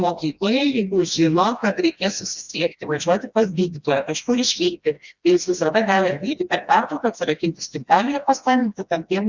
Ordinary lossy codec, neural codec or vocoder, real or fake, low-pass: Opus, 64 kbps; codec, 16 kHz, 1 kbps, FreqCodec, smaller model; fake; 7.2 kHz